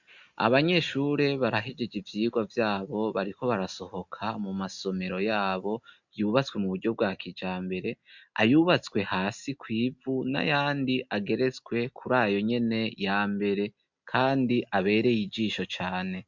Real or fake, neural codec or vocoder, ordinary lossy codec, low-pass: real; none; MP3, 64 kbps; 7.2 kHz